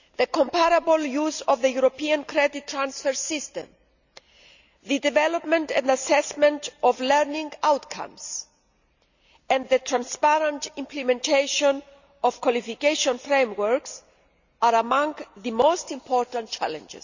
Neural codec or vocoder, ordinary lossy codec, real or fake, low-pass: none; none; real; 7.2 kHz